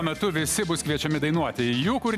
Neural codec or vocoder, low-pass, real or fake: none; 14.4 kHz; real